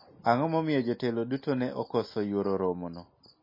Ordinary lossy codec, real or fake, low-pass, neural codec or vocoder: MP3, 24 kbps; real; 5.4 kHz; none